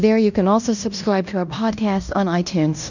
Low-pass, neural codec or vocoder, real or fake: 7.2 kHz; codec, 16 kHz in and 24 kHz out, 0.9 kbps, LongCat-Audio-Codec, fine tuned four codebook decoder; fake